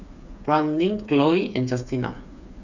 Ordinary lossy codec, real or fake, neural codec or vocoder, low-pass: none; fake; codec, 16 kHz, 4 kbps, FreqCodec, smaller model; 7.2 kHz